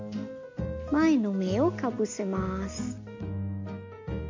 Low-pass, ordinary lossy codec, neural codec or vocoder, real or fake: 7.2 kHz; MP3, 64 kbps; none; real